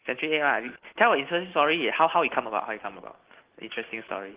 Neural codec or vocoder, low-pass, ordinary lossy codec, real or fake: none; 3.6 kHz; Opus, 16 kbps; real